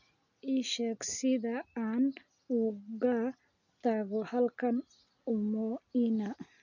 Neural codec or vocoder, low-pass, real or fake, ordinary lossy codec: none; 7.2 kHz; real; none